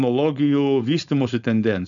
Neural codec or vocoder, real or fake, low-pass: codec, 16 kHz, 4.8 kbps, FACodec; fake; 7.2 kHz